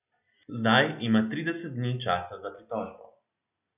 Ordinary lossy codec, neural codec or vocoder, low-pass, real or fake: none; none; 3.6 kHz; real